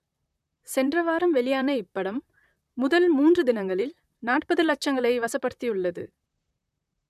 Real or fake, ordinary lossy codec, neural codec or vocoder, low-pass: fake; none; vocoder, 44.1 kHz, 128 mel bands, Pupu-Vocoder; 14.4 kHz